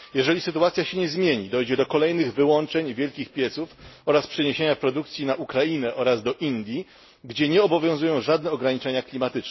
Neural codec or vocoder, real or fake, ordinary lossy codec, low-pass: none; real; MP3, 24 kbps; 7.2 kHz